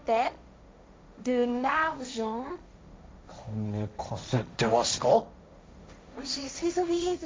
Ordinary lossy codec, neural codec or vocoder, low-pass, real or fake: none; codec, 16 kHz, 1.1 kbps, Voila-Tokenizer; none; fake